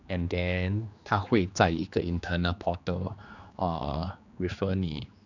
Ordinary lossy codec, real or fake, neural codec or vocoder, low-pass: none; fake; codec, 16 kHz, 2 kbps, X-Codec, HuBERT features, trained on general audio; 7.2 kHz